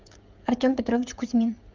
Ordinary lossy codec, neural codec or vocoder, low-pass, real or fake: Opus, 32 kbps; codec, 16 kHz, 16 kbps, FreqCodec, smaller model; 7.2 kHz; fake